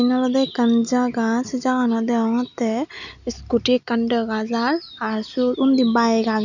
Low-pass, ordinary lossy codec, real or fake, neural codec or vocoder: 7.2 kHz; none; real; none